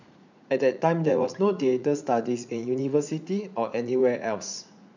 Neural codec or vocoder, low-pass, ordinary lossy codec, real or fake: vocoder, 44.1 kHz, 80 mel bands, Vocos; 7.2 kHz; none; fake